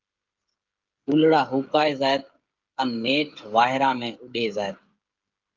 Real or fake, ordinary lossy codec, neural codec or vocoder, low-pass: fake; Opus, 32 kbps; codec, 16 kHz, 16 kbps, FreqCodec, smaller model; 7.2 kHz